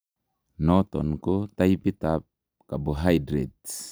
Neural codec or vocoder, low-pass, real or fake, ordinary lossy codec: none; none; real; none